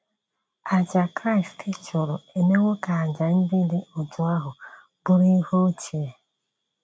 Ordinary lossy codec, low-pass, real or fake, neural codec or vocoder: none; none; real; none